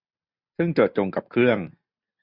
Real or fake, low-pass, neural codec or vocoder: real; 5.4 kHz; none